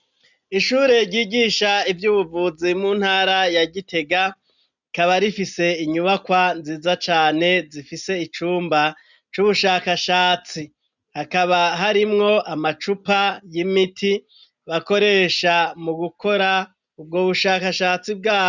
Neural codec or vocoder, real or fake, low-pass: none; real; 7.2 kHz